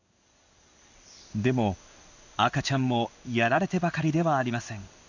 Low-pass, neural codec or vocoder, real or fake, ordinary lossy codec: 7.2 kHz; codec, 16 kHz in and 24 kHz out, 1 kbps, XY-Tokenizer; fake; none